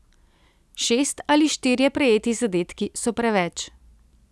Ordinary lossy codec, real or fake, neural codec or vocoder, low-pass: none; real; none; none